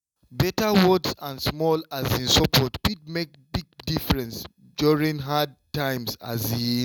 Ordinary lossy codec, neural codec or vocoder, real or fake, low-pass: none; none; real; 19.8 kHz